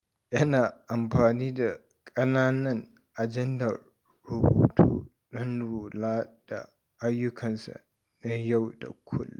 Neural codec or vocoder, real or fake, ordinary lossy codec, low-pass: none; real; Opus, 32 kbps; 19.8 kHz